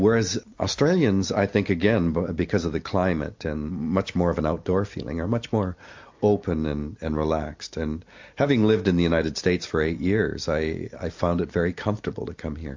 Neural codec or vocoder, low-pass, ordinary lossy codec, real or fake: none; 7.2 kHz; MP3, 48 kbps; real